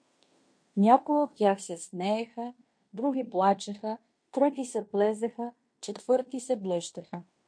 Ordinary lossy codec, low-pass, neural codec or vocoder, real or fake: MP3, 48 kbps; 9.9 kHz; codec, 16 kHz in and 24 kHz out, 0.9 kbps, LongCat-Audio-Codec, fine tuned four codebook decoder; fake